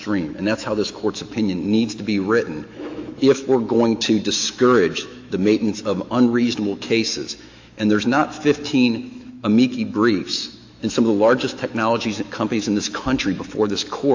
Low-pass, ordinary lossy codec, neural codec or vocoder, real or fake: 7.2 kHz; AAC, 48 kbps; autoencoder, 48 kHz, 128 numbers a frame, DAC-VAE, trained on Japanese speech; fake